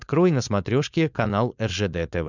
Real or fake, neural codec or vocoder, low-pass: fake; codec, 16 kHz, 4.8 kbps, FACodec; 7.2 kHz